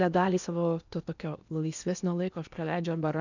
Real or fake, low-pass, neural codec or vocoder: fake; 7.2 kHz; codec, 16 kHz in and 24 kHz out, 0.8 kbps, FocalCodec, streaming, 65536 codes